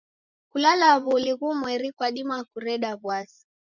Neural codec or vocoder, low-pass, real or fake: vocoder, 24 kHz, 100 mel bands, Vocos; 7.2 kHz; fake